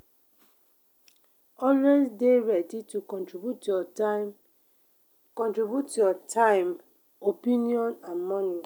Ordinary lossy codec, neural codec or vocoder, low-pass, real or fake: none; none; none; real